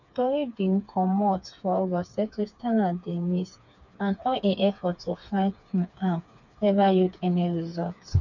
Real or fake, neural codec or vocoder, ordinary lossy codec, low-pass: fake; codec, 16 kHz, 4 kbps, FreqCodec, smaller model; none; 7.2 kHz